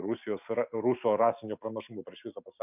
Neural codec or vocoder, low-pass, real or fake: none; 3.6 kHz; real